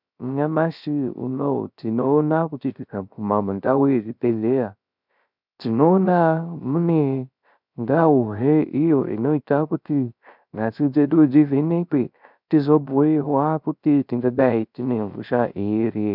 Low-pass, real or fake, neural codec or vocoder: 5.4 kHz; fake; codec, 16 kHz, 0.3 kbps, FocalCodec